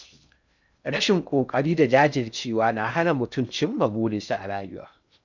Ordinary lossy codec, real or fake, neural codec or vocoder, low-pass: none; fake; codec, 16 kHz in and 24 kHz out, 0.6 kbps, FocalCodec, streaming, 2048 codes; 7.2 kHz